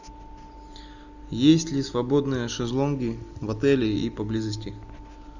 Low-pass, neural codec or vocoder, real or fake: 7.2 kHz; none; real